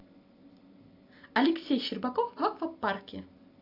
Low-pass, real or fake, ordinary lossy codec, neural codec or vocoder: 5.4 kHz; real; AAC, 32 kbps; none